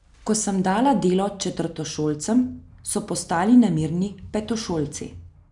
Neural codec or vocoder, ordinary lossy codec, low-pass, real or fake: none; none; 10.8 kHz; real